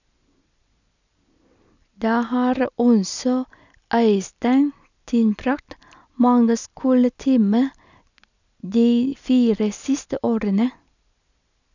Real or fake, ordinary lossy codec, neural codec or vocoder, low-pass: real; none; none; 7.2 kHz